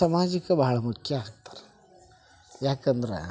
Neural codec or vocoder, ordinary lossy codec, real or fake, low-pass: none; none; real; none